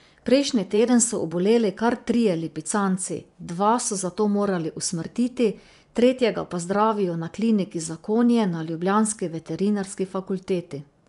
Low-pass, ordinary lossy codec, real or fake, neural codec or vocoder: 10.8 kHz; none; fake; vocoder, 24 kHz, 100 mel bands, Vocos